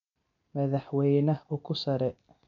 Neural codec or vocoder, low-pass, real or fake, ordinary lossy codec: none; 7.2 kHz; real; none